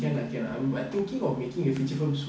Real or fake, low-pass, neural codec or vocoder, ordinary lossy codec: real; none; none; none